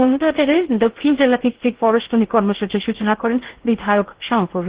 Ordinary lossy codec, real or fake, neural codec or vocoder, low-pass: Opus, 16 kbps; fake; codec, 16 kHz in and 24 kHz out, 0.6 kbps, FocalCodec, streaming, 2048 codes; 3.6 kHz